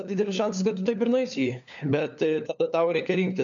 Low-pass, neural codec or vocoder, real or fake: 7.2 kHz; codec, 16 kHz, 4 kbps, FunCodec, trained on LibriTTS, 50 frames a second; fake